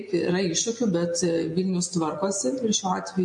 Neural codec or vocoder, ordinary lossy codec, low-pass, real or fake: none; MP3, 48 kbps; 10.8 kHz; real